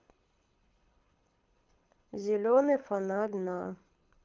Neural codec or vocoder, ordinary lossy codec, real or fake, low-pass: codec, 24 kHz, 6 kbps, HILCodec; Opus, 24 kbps; fake; 7.2 kHz